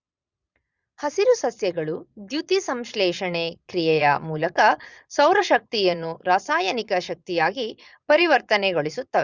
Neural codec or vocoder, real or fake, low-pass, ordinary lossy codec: vocoder, 22.05 kHz, 80 mel bands, Vocos; fake; 7.2 kHz; Opus, 64 kbps